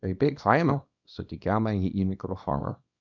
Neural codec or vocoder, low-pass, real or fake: codec, 24 kHz, 0.9 kbps, WavTokenizer, small release; 7.2 kHz; fake